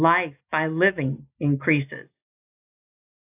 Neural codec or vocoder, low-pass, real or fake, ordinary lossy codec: none; 3.6 kHz; real; AAC, 32 kbps